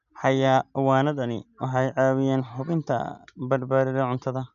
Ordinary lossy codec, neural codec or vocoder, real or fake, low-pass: none; none; real; 7.2 kHz